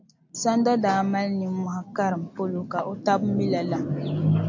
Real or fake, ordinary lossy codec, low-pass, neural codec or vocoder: real; AAC, 48 kbps; 7.2 kHz; none